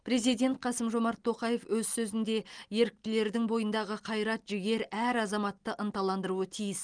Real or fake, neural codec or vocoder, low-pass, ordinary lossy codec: real; none; 9.9 kHz; Opus, 24 kbps